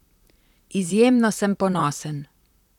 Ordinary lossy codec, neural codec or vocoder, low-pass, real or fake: none; vocoder, 44.1 kHz, 128 mel bands, Pupu-Vocoder; 19.8 kHz; fake